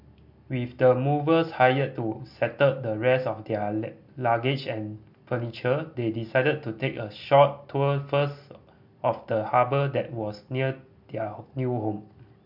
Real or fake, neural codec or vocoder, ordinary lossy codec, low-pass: real; none; MP3, 48 kbps; 5.4 kHz